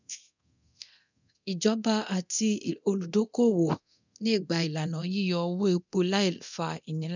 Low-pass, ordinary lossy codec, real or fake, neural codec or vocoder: 7.2 kHz; none; fake; codec, 24 kHz, 0.9 kbps, DualCodec